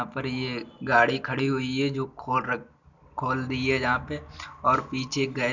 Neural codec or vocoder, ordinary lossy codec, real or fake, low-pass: none; none; real; 7.2 kHz